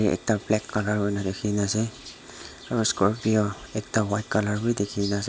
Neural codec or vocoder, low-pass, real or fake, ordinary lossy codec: none; none; real; none